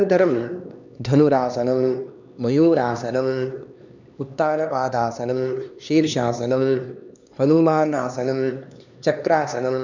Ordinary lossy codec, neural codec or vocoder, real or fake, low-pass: none; codec, 16 kHz, 2 kbps, X-Codec, HuBERT features, trained on LibriSpeech; fake; 7.2 kHz